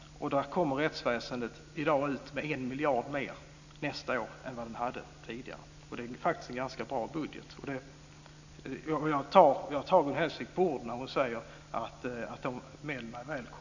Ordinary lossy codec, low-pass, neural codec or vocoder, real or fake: none; 7.2 kHz; none; real